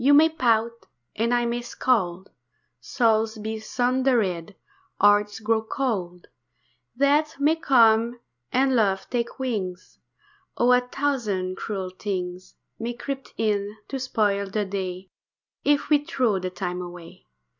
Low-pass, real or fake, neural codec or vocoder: 7.2 kHz; real; none